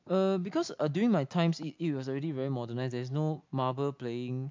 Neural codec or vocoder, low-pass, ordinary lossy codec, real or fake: none; 7.2 kHz; MP3, 64 kbps; real